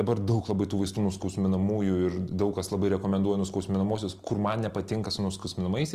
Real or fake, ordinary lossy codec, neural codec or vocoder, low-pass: real; Opus, 24 kbps; none; 14.4 kHz